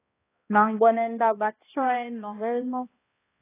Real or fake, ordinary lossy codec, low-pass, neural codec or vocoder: fake; AAC, 16 kbps; 3.6 kHz; codec, 16 kHz, 1 kbps, X-Codec, HuBERT features, trained on balanced general audio